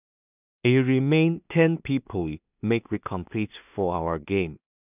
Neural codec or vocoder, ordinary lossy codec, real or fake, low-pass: codec, 16 kHz in and 24 kHz out, 0.4 kbps, LongCat-Audio-Codec, two codebook decoder; none; fake; 3.6 kHz